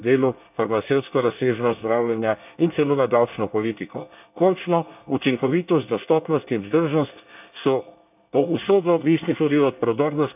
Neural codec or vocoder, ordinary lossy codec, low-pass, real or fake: codec, 24 kHz, 1 kbps, SNAC; none; 3.6 kHz; fake